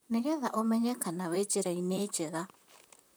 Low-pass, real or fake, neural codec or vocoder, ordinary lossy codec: none; fake; vocoder, 44.1 kHz, 128 mel bands, Pupu-Vocoder; none